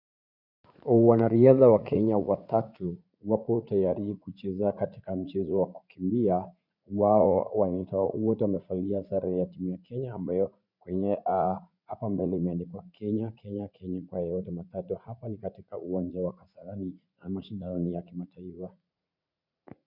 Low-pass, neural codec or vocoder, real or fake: 5.4 kHz; vocoder, 44.1 kHz, 80 mel bands, Vocos; fake